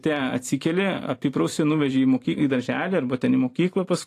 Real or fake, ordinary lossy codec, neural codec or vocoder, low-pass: fake; AAC, 48 kbps; vocoder, 44.1 kHz, 128 mel bands every 512 samples, BigVGAN v2; 14.4 kHz